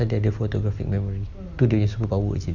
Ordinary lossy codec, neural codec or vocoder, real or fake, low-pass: none; none; real; 7.2 kHz